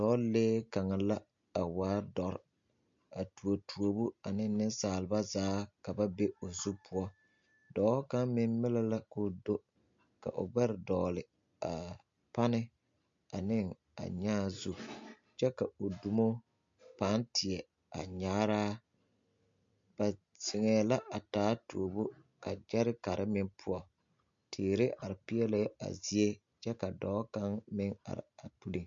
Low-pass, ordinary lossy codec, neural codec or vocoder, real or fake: 7.2 kHz; MP3, 48 kbps; none; real